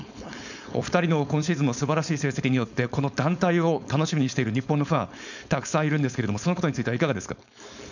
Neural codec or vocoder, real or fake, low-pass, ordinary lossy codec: codec, 16 kHz, 4.8 kbps, FACodec; fake; 7.2 kHz; none